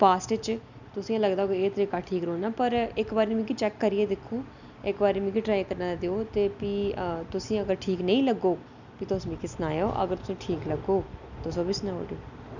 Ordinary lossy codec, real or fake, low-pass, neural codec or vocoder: none; real; 7.2 kHz; none